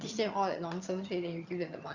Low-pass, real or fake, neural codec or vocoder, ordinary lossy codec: 7.2 kHz; fake; vocoder, 22.05 kHz, 80 mel bands, HiFi-GAN; Opus, 64 kbps